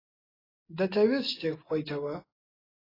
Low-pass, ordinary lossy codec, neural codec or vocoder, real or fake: 5.4 kHz; AAC, 24 kbps; none; real